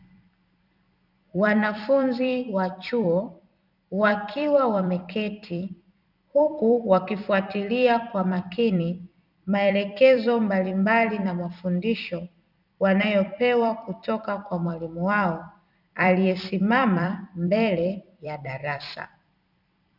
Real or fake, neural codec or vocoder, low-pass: fake; vocoder, 44.1 kHz, 128 mel bands every 256 samples, BigVGAN v2; 5.4 kHz